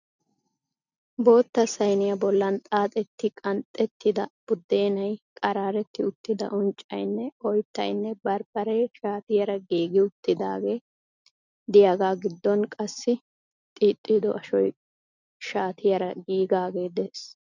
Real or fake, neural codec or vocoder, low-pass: real; none; 7.2 kHz